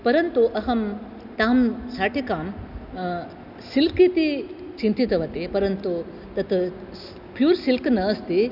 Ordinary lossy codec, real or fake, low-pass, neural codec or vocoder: none; real; 5.4 kHz; none